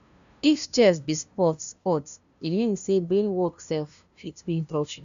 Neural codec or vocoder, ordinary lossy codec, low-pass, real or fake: codec, 16 kHz, 0.5 kbps, FunCodec, trained on LibriTTS, 25 frames a second; none; 7.2 kHz; fake